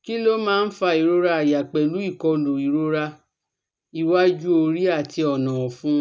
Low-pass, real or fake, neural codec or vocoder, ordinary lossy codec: none; real; none; none